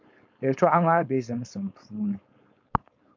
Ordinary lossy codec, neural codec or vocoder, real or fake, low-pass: MP3, 64 kbps; codec, 16 kHz, 4.8 kbps, FACodec; fake; 7.2 kHz